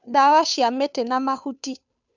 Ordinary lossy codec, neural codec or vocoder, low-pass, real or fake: none; codec, 16 kHz, 2 kbps, FunCodec, trained on Chinese and English, 25 frames a second; 7.2 kHz; fake